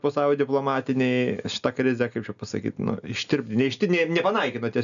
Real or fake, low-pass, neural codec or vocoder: real; 7.2 kHz; none